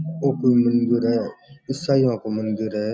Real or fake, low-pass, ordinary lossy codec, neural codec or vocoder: real; none; none; none